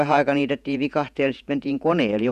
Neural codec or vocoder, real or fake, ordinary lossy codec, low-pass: vocoder, 48 kHz, 128 mel bands, Vocos; fake; none; 14.4 kHz